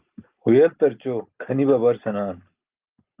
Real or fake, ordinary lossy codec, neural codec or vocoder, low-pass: real; Opus, 32 kbps; none; 3.6 kHz